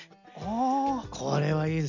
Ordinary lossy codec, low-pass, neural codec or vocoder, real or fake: none; 7.2 kHz; none; real